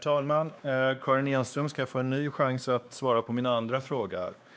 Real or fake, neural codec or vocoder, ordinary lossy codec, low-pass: fake; codec, 16 kHz, 2 kbps, X-Codec, WavLM features, trained on Multilingual LibriSpeech; none; none